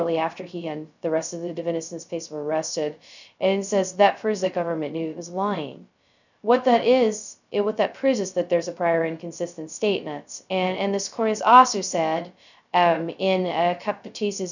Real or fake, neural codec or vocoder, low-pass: fake; codec, 16 kHz, 0.2 kbps, FocalCodec; 7.2 kHz